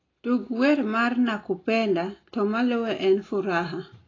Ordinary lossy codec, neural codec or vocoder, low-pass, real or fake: AAC, 32 kbps; none; 7.2 kHz; real